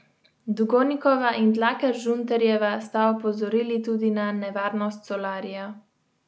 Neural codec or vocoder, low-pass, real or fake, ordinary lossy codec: none; none; real; none